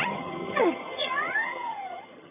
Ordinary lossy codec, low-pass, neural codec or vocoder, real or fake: none; 3.6 kHz; vocoder, 22.05 kHz, 80 mel bands, HiFi-GAN; fake